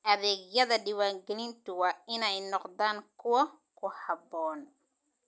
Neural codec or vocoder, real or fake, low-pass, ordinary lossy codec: none; real; none; none